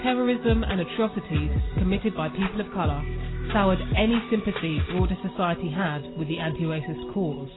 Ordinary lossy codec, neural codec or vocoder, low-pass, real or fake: AAC, 16 kbps; none; 7.2 kHz; real